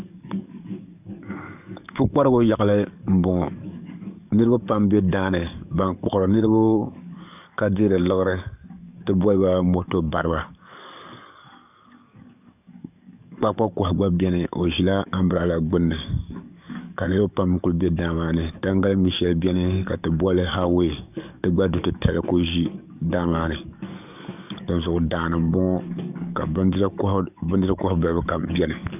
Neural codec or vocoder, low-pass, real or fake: codec, 44.1 kHz, 7.8 kbps, DAC; 3.6 kHz; fake